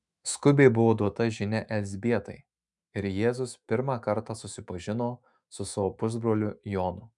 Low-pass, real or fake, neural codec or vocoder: 10.8 kHz; fake; autoencoder, 48 kHz, 128 numbers a frame, DAC-VAE, trained on Japanese speech